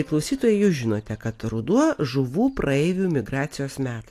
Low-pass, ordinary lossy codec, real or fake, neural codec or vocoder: 14.4 kHz; AAC, 64 kbps; real; none